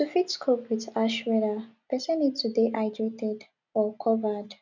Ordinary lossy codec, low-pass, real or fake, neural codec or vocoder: none; 7.2 kHz; real; none